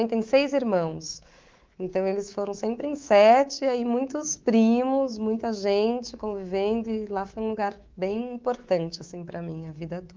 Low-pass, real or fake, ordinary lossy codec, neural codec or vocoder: 7.2 kHz; fake; Opus, 32 kbps; codec, 24 kHz, 3.1 kbps, DualCodec